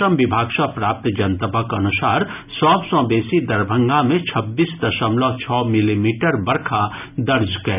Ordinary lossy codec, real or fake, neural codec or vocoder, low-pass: none; real; none; 3.6 kHz